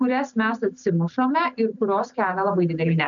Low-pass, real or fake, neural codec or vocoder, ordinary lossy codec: 7.2 kHz; real; none; MP3, 96 kbps